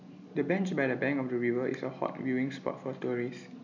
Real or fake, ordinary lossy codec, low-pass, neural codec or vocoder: real; none; 7.2 kHz; none